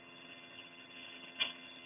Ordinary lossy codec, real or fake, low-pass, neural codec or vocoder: none; fake; 3.6 kHz; vocoder, 22.05 kHz, 80 mel bands, HiFi-GAN